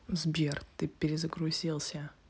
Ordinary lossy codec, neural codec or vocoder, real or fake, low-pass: none; none; real; none